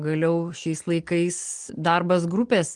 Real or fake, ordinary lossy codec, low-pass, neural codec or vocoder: real; Opus, 24 kbps; 10.8 kHz; none